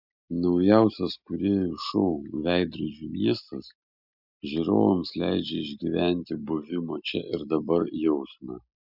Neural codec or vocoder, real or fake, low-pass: none; real; 5.4 kHz